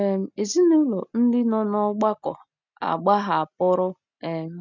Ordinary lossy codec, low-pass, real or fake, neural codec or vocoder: none; 7.2 kHz; real; none